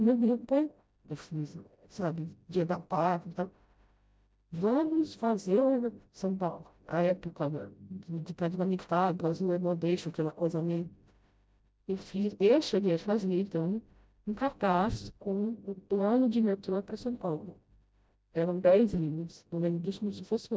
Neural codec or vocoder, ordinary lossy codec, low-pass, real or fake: codec, 16 kHz, 0.5 kbps, FreqCodec, smaller model; none; none; fake